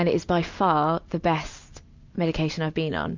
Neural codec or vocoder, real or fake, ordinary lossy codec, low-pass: none; real; MP3, 64 kbps; 7.2 kHz